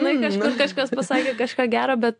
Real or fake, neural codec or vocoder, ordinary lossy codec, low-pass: real; none; AAC, 64 kbps; 9.9 kHz